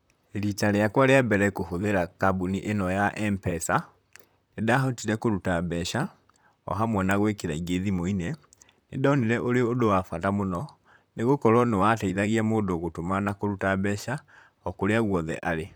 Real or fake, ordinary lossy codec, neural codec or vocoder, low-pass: fake; none; vocoder, 44.1 kHz, 128 mel bands, Pupu-Vocoder; none